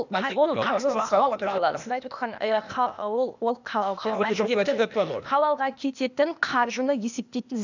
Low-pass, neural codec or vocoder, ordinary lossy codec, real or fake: 7.2 kHz; codec, 16 kHz, 0.8 kbps, ZipCodec; none; fake